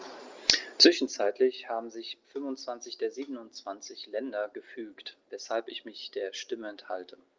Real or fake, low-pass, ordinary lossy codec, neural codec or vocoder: real; 7.2 kHz; Opus, 32 kbps; none